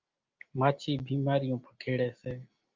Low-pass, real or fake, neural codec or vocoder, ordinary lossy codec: 7.2 kHz; real; none; Opus, 24 kbps